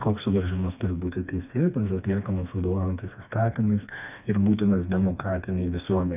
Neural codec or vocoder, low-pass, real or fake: codec, 16 kHz, 2 kbps, FreqCodec, smaller model; 3.6 kHz; fake